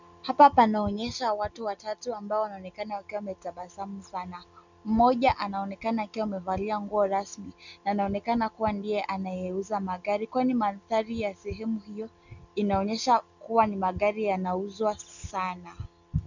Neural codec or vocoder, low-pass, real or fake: none; 7.2 kHz; real